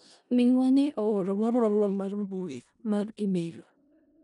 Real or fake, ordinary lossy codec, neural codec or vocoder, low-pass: fake; none; codec, 16 kHz in and 24 kHz out, 0.4 kbps, LongCat-Audio-Codec, four codebook decoder; 10.8 kHz